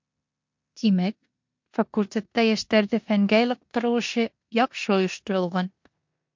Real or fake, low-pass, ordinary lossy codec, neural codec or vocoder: fake; 7.2 kHz; MP3, 48 kbps; codec, 16 kHz in and 24 kHz out, 0.9 kbps, LongCat-Audio-Codec, four codebook decoder